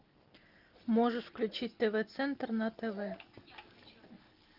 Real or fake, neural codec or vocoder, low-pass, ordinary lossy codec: real; none; 5.4 kHz; Opus, 32 kbps